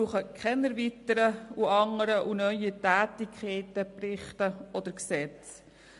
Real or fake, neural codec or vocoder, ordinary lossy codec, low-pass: real; none; MP3, 48 kbps; 14.4 kHz